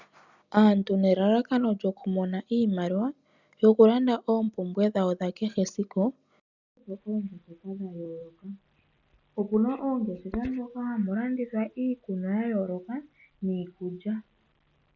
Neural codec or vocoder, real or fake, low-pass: none; real; 7.2 kHz